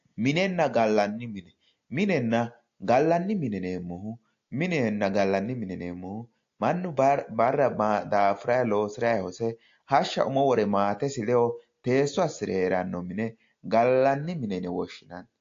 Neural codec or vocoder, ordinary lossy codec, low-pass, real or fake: none; AAC, 48 kbps; 7.2 kHz; real